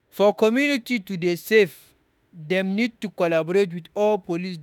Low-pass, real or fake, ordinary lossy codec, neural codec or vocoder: 19.8 kHz; fake; none; autoencoder, 48 kHz, 32 numbers a frame, DAC-VAE, trained on Japanese speech